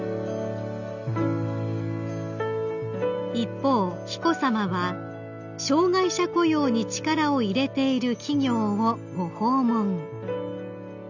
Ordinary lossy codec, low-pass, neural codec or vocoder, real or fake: none; 7.2 kHz; none; real